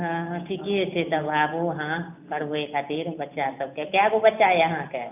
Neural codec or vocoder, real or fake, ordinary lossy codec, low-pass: none; real; none; 3.6 kHz